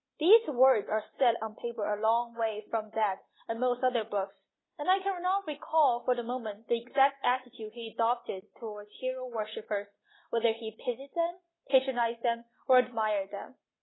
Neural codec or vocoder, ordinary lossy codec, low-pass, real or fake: none; AAC, 16 kbps; 7.2 kHz; real